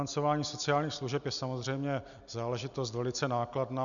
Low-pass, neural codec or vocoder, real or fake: 7.2 kHz; none; real